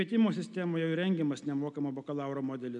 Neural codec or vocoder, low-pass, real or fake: none; 10.8 kHz; real